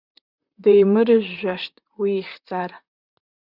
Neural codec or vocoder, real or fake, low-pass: vocoder, 44.1 kHz, 128 mel bands, Pupu-Vocoder; fake; 5.4 kHz